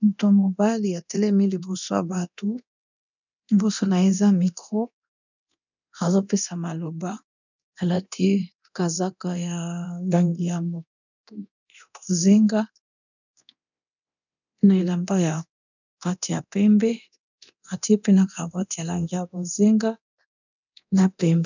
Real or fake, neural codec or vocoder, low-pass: fake; codec, 24 kHz, 0.9 kbps, DualCodec; 7.2 kHz